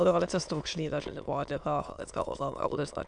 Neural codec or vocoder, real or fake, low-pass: autoencoder, 22.05 kHz, a latent of 192 numbers a frame, VITS, trained on many speakers; fake; 9.9 kHz